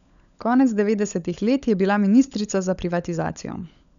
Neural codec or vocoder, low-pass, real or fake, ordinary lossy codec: codec, 16 kHz, 16 kbps, FunCodec, trained on LibriTTS, 50 frames a second; 7.2 kHz; fake; none